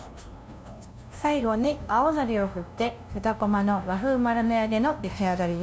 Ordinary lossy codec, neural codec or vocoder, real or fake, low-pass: none; codec, 16 kHz, 0.5 kbps, FunCodec, trained on LibriTTS, 25 frames a second; fake; none